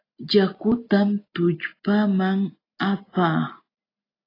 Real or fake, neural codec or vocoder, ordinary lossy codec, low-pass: real; none; AAC, 24 kbps; 5.4 kHz